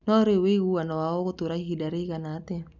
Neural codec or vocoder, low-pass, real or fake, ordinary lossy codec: none; 7.2 kHz; real; none